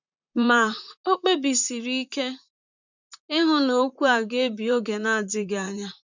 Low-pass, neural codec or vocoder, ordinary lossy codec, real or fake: 7.2 kHz; vocoder, 44.1 kHz, 128 mel bands, Pupu-Vocoder; none; fake